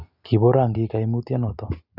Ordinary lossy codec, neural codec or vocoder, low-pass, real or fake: none; none; 5.4 kHz; real